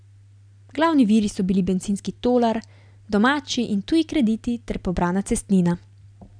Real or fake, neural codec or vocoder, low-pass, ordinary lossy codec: real; none; 9.9 kHz; none